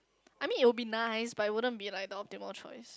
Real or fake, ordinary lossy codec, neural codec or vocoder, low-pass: real; none; none; none